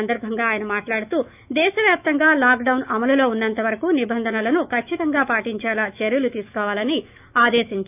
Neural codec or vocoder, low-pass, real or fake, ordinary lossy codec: autoencoder, 48 kHz, 128 numbers a frame, DAC-VAE, trained on Japanese speech; 3.6 kHz; fake; none